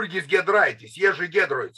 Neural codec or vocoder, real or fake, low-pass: none; real; 14.4 kHz